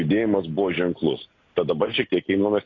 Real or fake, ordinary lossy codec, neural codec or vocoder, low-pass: real; AAC, 32 kbps; none; 7.2 kHz